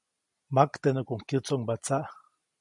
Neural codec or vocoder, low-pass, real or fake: none; 10.8 kHz; real